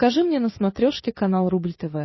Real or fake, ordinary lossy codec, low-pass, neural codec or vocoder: real; MP3, 24 kbps; 7.2 kHz; none